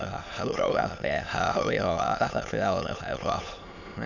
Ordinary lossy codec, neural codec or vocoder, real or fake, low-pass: none; autoencoder, 22.05 kHz, a latent of 192 numbers a frame, VITS, trained on many speakers; fake; 7.2 kHz